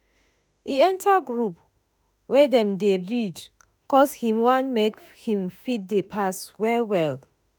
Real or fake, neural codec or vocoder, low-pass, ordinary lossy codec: fake; autoencoder, 48 kHz, 32 numbers a frame, DAC-VAE, trained on Japanese speech; none; none